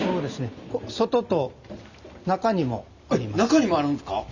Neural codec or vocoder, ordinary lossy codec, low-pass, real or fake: none; none; 7.2 kHz; real